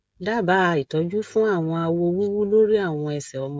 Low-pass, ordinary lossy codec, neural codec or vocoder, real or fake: none; none; codec, 16 kHz, 16 kbps, FreqCodec, smaller model; fake